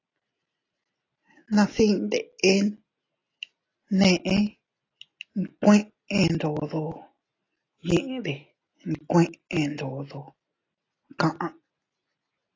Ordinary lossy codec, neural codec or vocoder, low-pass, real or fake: AAC, 32 kbps; none; 7.2 kHz; real